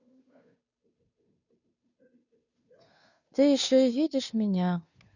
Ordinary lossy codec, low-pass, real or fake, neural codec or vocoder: none; 7.2 kHz; fake; codec, 16 kHz, 2 kbps, FunCodec, trained on Chinese and English, 25 frames a second